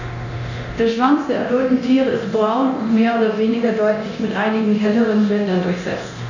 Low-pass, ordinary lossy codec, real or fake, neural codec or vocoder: 7.2 kHz; none; fake; codec, 24 kHz, 0.9 kbps, DualCodec